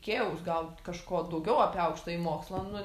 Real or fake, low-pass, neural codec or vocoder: real; 14.4 kHz; none